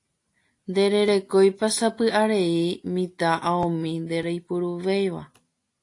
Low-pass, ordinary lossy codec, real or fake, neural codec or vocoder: 10.8 kHz; AAC, 48 kbps; real; none